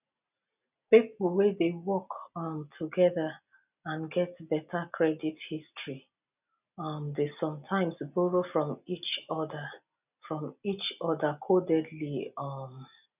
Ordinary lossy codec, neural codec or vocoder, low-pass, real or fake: none; none; 3.6 kHz; real